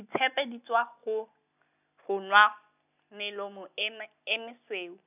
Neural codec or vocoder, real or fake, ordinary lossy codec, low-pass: none; real; none; 3.6 kHz